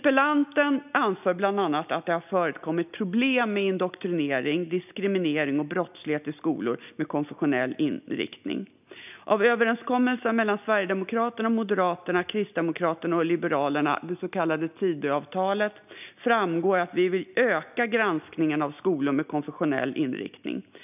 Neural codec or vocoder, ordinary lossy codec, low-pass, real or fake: none; none; 3.6 kHz; real